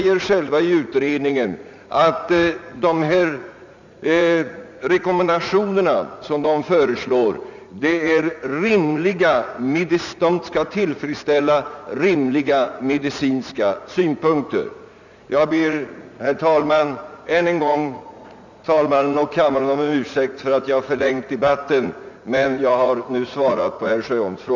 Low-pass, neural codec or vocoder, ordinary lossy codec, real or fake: 7.2 kHz; vocoder, 44.1 kHz, 80 mel bands, Vocos; none; fake